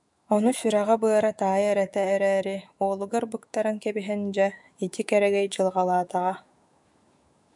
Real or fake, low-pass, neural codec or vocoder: fake; 10.8 kHz; autoencoder, 48 kHz, 128 numbers a frame, DAC-VAE, trained on Japanese speech